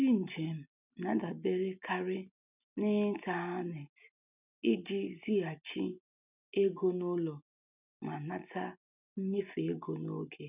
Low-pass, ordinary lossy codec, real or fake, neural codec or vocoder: 3.6 kHz; none; real; none